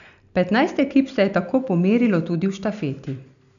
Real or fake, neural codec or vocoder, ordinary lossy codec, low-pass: real; none; none; 7.2 kHz